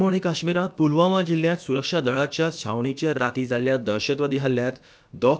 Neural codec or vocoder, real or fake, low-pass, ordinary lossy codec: codec, 16 kHz, about 1 kbps, DyCAST, with the encoder's durations; fake; none; none